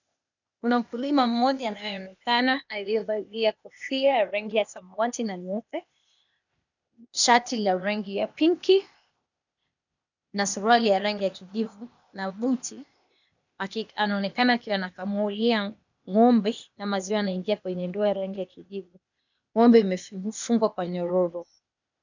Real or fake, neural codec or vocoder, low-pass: fake; codec, 16 kHz, 0.8 kbps, ZipCodec; 7.2 kHz